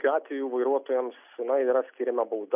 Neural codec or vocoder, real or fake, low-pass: none; real; 3.6 kHz